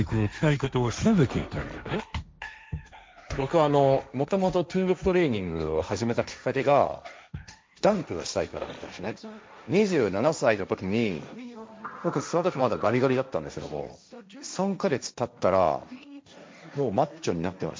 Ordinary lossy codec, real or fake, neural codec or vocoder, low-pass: none; fake; codec, 16 kHz, 1.1 kbps, Voila-Tokenizer; none